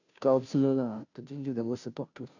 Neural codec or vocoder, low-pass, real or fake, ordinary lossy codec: codec, 16 kHz, 0.5 kbps, FunCodec, trained on Chinese and English, 25 frames a second; 7.2 kHz; fake; none